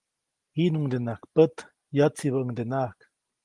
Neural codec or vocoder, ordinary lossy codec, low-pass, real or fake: none; Opus, 24 kbps; 10.8 kHz; real